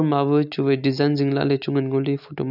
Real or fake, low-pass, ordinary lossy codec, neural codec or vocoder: real; 5.4 kHz; none; none